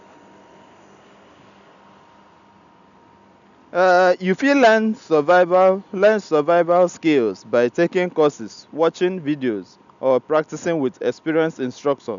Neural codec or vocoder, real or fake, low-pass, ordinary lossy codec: none; real; 7.2 kHz; none